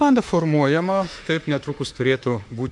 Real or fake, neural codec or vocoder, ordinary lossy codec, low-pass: fake; autoencoder, 48 kHz, 32 numbers a frame, DAC-VAE, trained on Japanese speech; AAC, 64 kbps; 10.8 kHz